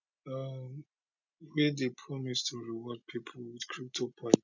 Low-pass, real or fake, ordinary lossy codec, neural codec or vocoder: 7.2 kHz; real; none; none